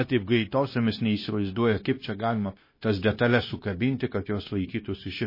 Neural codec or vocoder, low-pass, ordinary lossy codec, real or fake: codec, 16 kHz, 0.9 kbps, LongCat-Audio-Codec; 5.4 kHz; MP3, 24 kbps; fake